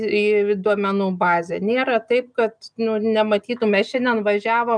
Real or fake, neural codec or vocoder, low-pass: real; none; 9.9 kHz